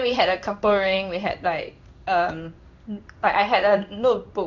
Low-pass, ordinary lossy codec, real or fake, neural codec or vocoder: 7.2 kHz; none; fake; codec, 16 kHz in and 24 kHz out, 1 kbps, XY-Tokenizer